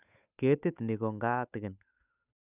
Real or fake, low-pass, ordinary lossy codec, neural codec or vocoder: fake; 3.6 kHz; none; codec, 16 kHz, 8 kbps, FunCodec, trained on Chinese and English, 25 frames a second